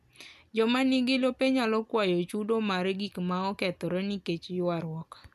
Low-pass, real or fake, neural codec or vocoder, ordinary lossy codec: 14.4 kHz; real; none; none